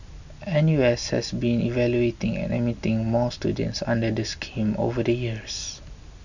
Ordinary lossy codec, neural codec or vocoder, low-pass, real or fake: none; none; 7.2 kHz; real